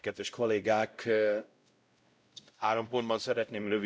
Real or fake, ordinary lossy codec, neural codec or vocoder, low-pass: fake; none; codec, 16 kHz, 0.5 kbps, X-Codec, WavLM features, trained on Multilingual LibriSpeech; none